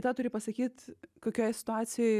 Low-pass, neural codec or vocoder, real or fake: 14.4 kHz; none; real